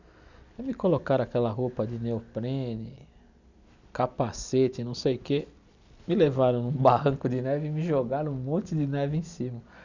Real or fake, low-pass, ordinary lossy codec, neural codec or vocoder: real; 7.2 kHz; none; none